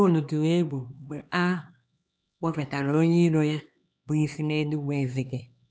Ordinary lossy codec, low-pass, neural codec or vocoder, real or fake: none; none; codec, 16 kHz, 2 kbps, X-Codec, HuBERT features, trained on LibriSpeech; fake